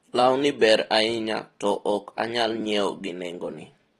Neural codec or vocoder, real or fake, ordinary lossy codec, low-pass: vocoder, 44.1 kHz, 128 mel bands every 256 samples, BigVGAN v2; fake; AAC, 32 kbps; 19.8 kHz